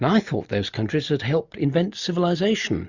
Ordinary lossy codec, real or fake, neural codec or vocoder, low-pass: Opus, 64 kbps; real; none; 7.2 kHz